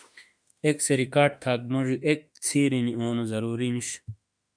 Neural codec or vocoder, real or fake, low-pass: autoencoder, 48 kHz, 32 numbers a frame, DAC-VAE, trained on Japanese speech; fake; 9.9 kHz